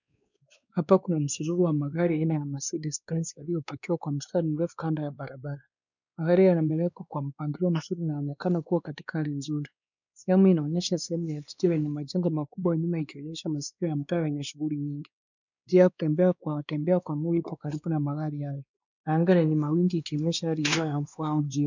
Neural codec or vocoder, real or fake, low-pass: codec, 16 kHz, 2 kbps, X-Codec, WavLM features, trained on Multilingual LibriSpeech; fake; 7.2 kHz